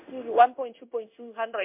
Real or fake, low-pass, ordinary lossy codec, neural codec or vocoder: fake; 3.6 kHz; MP3, 32 kbps; codec, 16 kHz in and 24 kHz out, 1 kbps, XY-Tokenizer